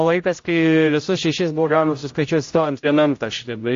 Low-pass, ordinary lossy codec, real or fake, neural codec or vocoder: 7.2 kHz; AAC, 48 kbps; fake; codec, 16 kHz, 0.5 kbps, X-Codec, HuBERT features, trained on general audio